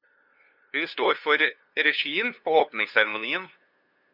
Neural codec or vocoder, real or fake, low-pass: codec, 16 kHz, 2 kbps, FunCodec, trained on LibriTTS, 25 frames a second; fake; 5.4 kHz